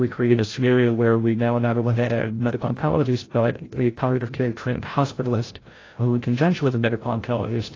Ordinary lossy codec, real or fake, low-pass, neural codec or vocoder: AAC, 32 kbps; fake; 7.2 kHz; codec, 16 kHz, 0.5 kbps, FreqCodec, larger model